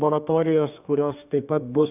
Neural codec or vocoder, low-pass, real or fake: codec, 32 kHz, 1.9 kbps, SNAC; 3.6 kHz; fake